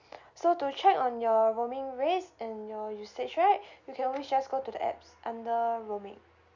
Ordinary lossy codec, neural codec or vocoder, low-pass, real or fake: none; none; 7.2 kHz; real